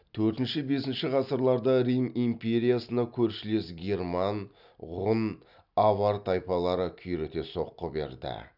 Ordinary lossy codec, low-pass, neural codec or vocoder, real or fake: none; 5.4 kHz; none; real